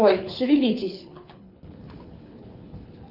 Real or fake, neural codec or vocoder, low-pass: fake; codec, 24 kHz, 6 kbps, HILCodec; 5.4 kHz